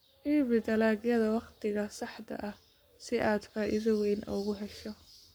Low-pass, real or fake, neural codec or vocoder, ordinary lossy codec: none; fake; codec, 44.1 kHz, 7.8 kbps, Pupu-Codec; none